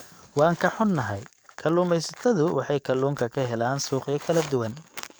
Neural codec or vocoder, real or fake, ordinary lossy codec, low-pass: codec, 44.1 kHz, 7.8 kbps, DAC; fake; none; none